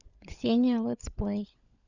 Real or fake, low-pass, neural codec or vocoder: fake; 7.2 kHz; codec, 16 kHz, 16 kbps, FunCodec, trained on LibriTTS, 50 frames a second